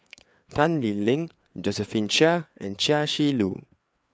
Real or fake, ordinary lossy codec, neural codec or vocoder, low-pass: fake; none; codec, 16 kHz, 4 kbps, FreqCodec, larger model; none